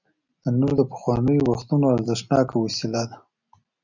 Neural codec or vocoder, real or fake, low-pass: none; real; 7.2 kHz